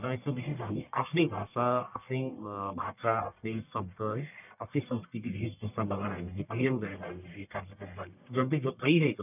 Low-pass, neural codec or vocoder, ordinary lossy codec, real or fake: 3.6 kHz; codec, 44.1 kHz, 1.7 kbps, Pupu-Codec; none; fake